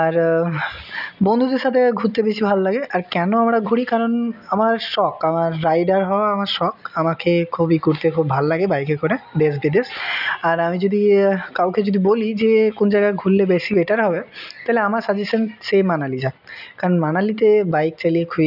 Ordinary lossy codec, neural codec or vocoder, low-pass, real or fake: none; none; 5.4 kHz; real